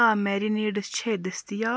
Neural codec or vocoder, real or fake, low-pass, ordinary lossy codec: none; real; none; none